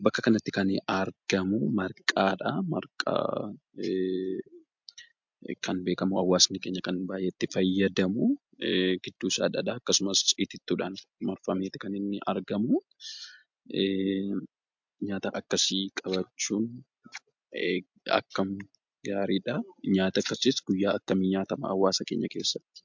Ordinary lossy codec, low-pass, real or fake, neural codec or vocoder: MP3, 64 kbps; 7.2 kHz; real; none